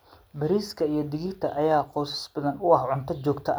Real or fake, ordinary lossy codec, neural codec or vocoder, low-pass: real; none; none; none